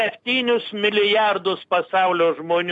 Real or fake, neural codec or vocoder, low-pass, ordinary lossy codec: real; none; 10.8 kHz; AAC, 64 kbps